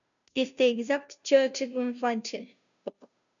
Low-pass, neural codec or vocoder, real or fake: 7.2 kHz; codec, 16 kHz, 0.5 kbps, FunCodec, trained on Chinese and English, 25 frames a second; fake